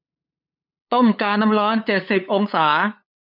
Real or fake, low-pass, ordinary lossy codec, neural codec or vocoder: fake; 5.4 kHz; none; codec, 16 kHz, 8 kbps, FunCodec, trained on LibriTTS, 25 frames a second